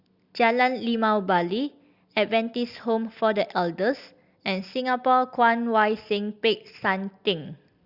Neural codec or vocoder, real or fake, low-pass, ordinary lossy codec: none; real; 5.4 kHz; Opus, 64 kbps